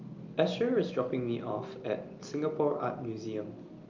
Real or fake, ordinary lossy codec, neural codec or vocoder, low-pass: real; Opus, 24 kbps; none; 7.2 kHz